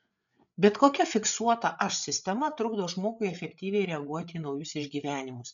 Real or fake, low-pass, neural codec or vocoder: fake; 7.2 kHz; codec, 16 kHz, 8 kbps, FreqCodec, larger model